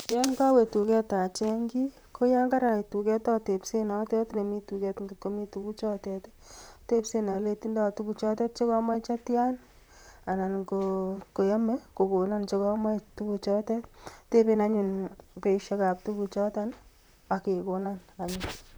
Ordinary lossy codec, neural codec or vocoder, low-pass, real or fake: none; vocoder, 44.1 kHz, 128 mel bands, Pupu-Vocoder; none; fake